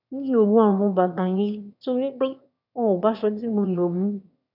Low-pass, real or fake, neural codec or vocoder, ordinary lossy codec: 5.4 kHz; fake; autoencoder, 22.05 kHz, a latent of 192 numbers a frame, VITS, trained on one speaker; none